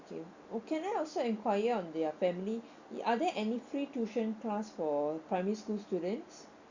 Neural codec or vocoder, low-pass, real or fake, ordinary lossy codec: none; 7.2 kHz; real; Opus, 64 kbps